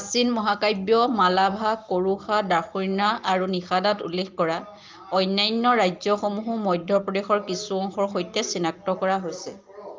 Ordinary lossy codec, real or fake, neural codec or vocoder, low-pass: Opus, 24 kbps; real; none; 7.2 kHz